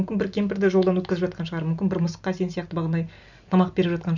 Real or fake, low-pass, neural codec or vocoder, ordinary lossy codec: real; 7.2 kHz; none; none